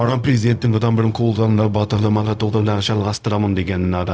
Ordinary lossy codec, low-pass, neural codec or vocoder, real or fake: none; none; codec, 16 kHz, 0.4 kbps, LongCat-Audio-Codec; fake